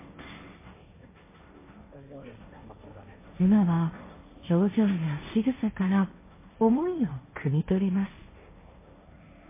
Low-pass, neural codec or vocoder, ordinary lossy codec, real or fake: 3.6 kHz; codec, 16 kHz, 1.1 kbps, Voila-Tokenizer; MP3, 16 kbps; fake